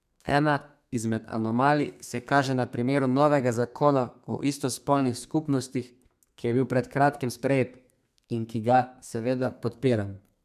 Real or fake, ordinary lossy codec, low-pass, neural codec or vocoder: fake; none; 14.4 kHz; codec, 32 kHz, 1.9 kbps, SNAC